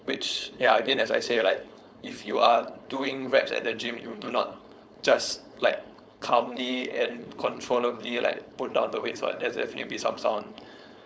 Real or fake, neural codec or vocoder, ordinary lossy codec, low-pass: fake; codec, 16 kHz, 4.8 kbps, FACodec; none; none